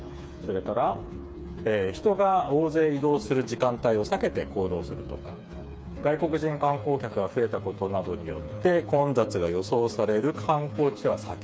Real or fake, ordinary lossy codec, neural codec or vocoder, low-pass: fake; none; codec, 16 kHz, 4 kbps, FreqCodec, smaller model; none